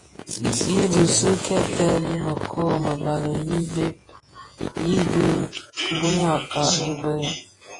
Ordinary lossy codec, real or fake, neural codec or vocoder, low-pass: AAC, 32 kbps; fake; vocoder, 48 kHz, 128 mel bands, Vocos; 10.8 kHz